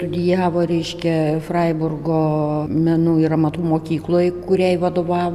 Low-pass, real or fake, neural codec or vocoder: 14.4 kHz; real; none